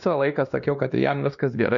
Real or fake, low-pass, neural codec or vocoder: fake; 7.2 kHz; codec, 16 kHz, 1 kbps, X-Codec, WavLM features, trained on Multilingual LibriSpeech